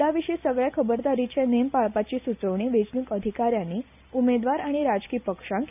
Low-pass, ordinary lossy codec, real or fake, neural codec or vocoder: 3.6 kHz; none; real; none